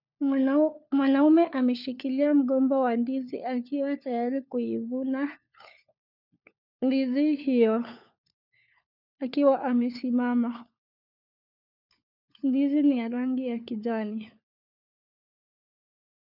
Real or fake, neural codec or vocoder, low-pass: fake; codec, 16 kHz, 4 kbps, FunCodec, trained on LibriTTS, 50 frames a second; 5.4 kHz